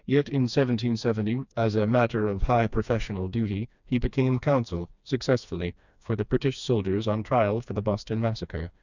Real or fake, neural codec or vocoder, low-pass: fake; codec, 16 kHz, 2 kbps, FreqCodec, smaller model; 7.2 kHz